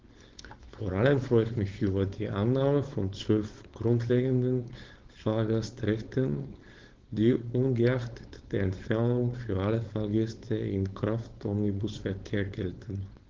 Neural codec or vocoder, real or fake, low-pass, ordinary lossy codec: codec, 16 kHz, 4.8 kbps, FACodec; fake; 7.2 kHz; Opus, 16 kbps